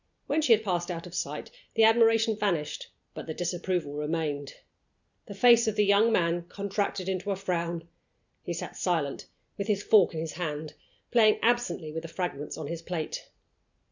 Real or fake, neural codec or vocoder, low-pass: real; none; 7.2 kHz